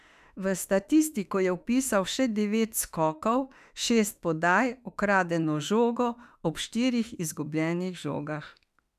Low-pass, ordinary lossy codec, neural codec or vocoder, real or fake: 14.4 kHz; none; autoencoder, 48 kHz, 32 numbers a frame, DAC-VAE, trained on Japanese speech; fake